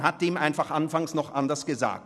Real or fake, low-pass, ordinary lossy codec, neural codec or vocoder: real; none; none; none